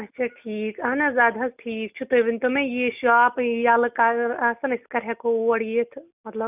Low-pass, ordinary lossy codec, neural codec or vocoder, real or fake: 3.6 kHz; none; none; real